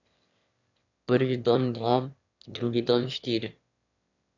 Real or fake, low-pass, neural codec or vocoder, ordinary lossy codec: fake; 7.2 kHz; autoencoder, 22.05 kHz, a latent of 192 numbers a frame, VITS, trained on one speaker; none